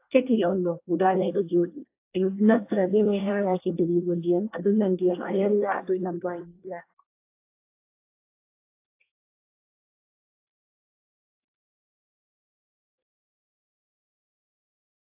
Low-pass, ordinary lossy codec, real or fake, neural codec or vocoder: 3.6 kHz; AAC, 24 kbps; fake; codec, 24 kHz, 1 kbps, SNAC